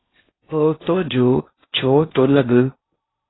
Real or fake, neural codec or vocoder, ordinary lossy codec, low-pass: fake; codec, 16 kHz in and 24 kHz out, 0.6 kbps, FocalCodec, streaming, 4096 codes; AAC, 16 kbps; 7.2 kHz